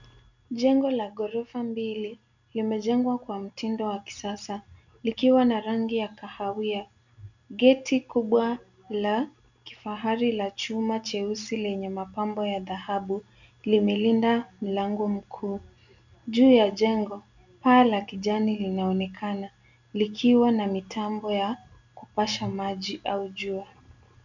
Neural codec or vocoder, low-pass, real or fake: none; 7.2 kHz; real